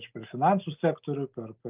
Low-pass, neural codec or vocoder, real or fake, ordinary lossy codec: 3.6 kHz; none; real; Opus, 32 kbps